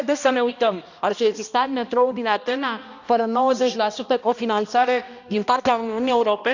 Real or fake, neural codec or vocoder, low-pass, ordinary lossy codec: fake; codec, 16 kHz, 1 kbps, X-Codec, HuBERT features, trained on balanced general audio; 7.2 kHz; none